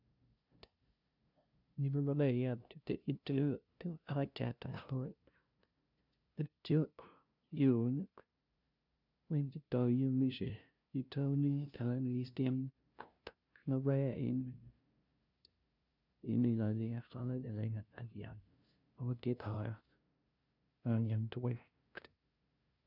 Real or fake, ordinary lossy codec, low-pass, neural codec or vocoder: fake; none; 5.4 kHz; codec, 16 kHz, 0.5 kbps, FunCodec, trained on LibriTTS, 25 frames a second